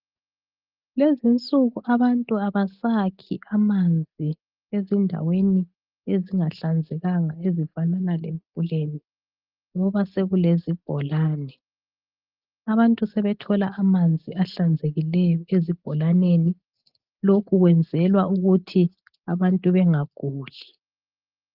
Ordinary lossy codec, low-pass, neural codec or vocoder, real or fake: Opus, 32 kbps; 5.4 kHz; none; real